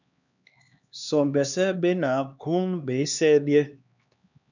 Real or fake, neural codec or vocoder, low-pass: fake; codec, 16 kHz, 2 kbps, X-Codec, HuBERT features, trained on LibriSpeech; 7.2 kHz